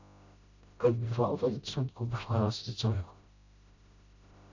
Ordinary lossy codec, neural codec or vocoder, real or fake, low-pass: AAC, 48 kbps; codec, 16 kHz, 0.5 kbps, FreqCodec, smaller model; fake; 7.2 kHz